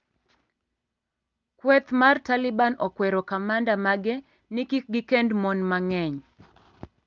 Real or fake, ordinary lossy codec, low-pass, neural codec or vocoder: real; Opus, 24 kbps; 7.2 kHz; none